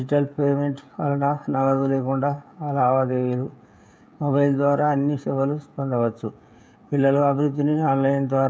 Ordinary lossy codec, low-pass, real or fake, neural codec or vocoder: none; none; fake; codec, 16 kHz, 16 kbps, FreqCodec, smaller model